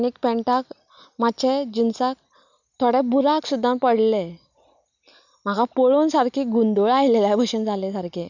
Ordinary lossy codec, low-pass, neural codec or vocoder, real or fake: none; 7.2 kHz; none; real